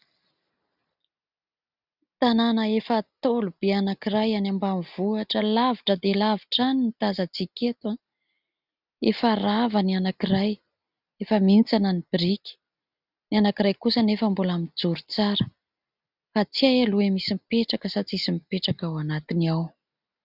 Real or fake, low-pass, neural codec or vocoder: real; 5.4 kHz; none